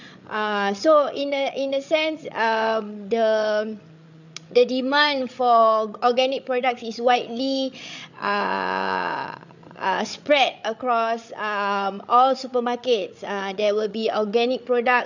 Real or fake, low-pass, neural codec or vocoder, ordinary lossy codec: fake; 7.2 kHz; codec, 16 kHz, 8 kbps, FreqCodec, larger model; none